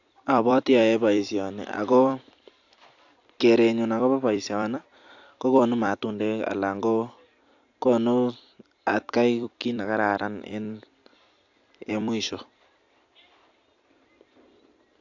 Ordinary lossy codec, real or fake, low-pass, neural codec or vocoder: AAC, 48 kbps; fake; 7.2 kHz; vocoder, 44.1 kHz, 128 mel bands every 256 samples, BigVGAN v2